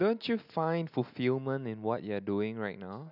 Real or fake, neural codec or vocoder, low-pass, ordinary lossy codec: real; none; 5.4 kHz; none